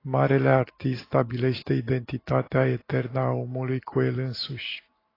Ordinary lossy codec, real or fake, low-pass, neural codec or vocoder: AAC, 24 kbps; real; 5.4 kHz; none